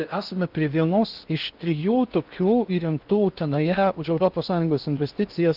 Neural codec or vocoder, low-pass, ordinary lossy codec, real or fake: codec, 16 kHz in and 24 kHz out, 0.8 kbps, FocalCodec, streaming, 65536 codes; 5.4 kHz; Opus, 32 kbps; fake